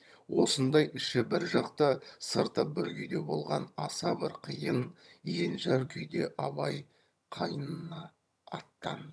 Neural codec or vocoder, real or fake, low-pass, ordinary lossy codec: vocoder, 22.05 kHz, 80 mel bands, HiFi-GAN; fake; none; none